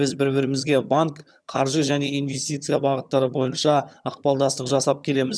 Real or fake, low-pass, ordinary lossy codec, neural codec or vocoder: fake; none; none; vocoder, 22.05 kHz, 80 mel bands, HiFi-GAN